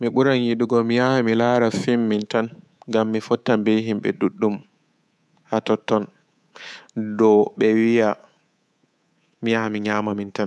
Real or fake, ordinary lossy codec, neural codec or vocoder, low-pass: fake; none; codec, 24 kHz, 3.1 kbps, DualCodec; none